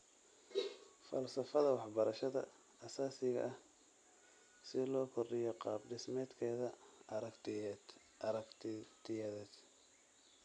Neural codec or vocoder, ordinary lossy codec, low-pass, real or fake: none; none; 9.9 kHz; real